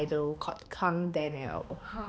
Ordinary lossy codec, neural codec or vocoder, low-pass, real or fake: none; codec, 16 kHz, 2 kbps, X-Codec, HuBERT features, trained on balanced general audio; none; fake